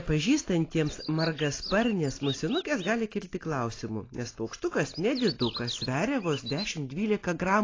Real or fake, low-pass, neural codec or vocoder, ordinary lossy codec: real; 7.2 kHz; none; AAC, 32 kbps